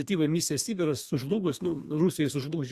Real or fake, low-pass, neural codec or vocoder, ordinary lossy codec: fake; 14.4 kHz; codec, 32 kHz, 1.9 kbps, SNAC; Opus, 64 kbps